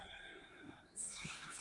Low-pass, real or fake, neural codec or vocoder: 10.8 kHz; fake; codec, 24 kHz, 1 kbps, SNAC